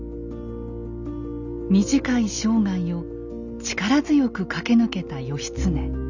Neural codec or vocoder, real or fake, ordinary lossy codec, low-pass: none; real; none; 7.2 kHz